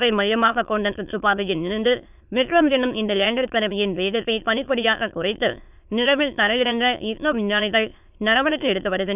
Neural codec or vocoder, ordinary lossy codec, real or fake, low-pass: autoencoder, 22.05 kHz, a latent of 192 numbers a frame, VITS, trained on many speakers; none; fake; 3.6 kHz